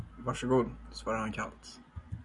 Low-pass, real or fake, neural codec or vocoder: 10.8 kHz; real; none